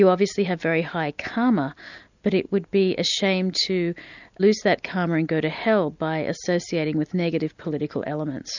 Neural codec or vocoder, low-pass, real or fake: none; 7.2 kHz; real